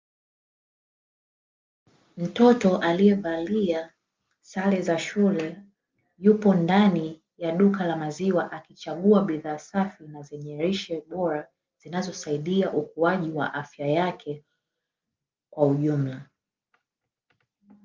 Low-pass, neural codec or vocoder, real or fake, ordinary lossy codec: 7.2 kHz; none; real; Opus, 24 kbps